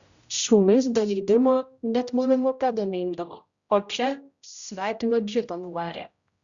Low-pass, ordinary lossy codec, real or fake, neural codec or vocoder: 7.2 kHz; Opus, 64 kbps; fake; codec, 16 kHz, 0.5 kbps, X-Codec, HuBERT features, trained on general audio